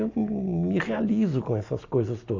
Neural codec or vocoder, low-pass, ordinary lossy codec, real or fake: none; 7.2 kHz; AAC, 48 kbps; real